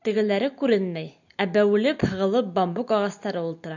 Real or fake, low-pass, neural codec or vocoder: real; 7.2 kHz; none